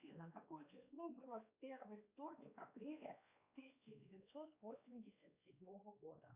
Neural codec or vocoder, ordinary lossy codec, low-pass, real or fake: codec, 16 kHz, 2 kbps, X-Codec, WavLM features, trained on Multilingual LibriSpeech; Opus, 64 kbps; 3.6 kHz; fake